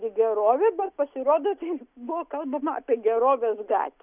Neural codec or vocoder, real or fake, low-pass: none; real; 3.6 kHz